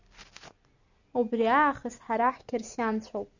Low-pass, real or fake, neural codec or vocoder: 7.2 kHz; real; none